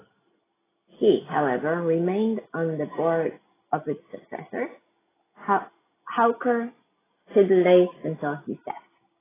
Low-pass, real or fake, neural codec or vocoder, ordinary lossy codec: 3.6 kHz; real; none; AAC, 16 kbps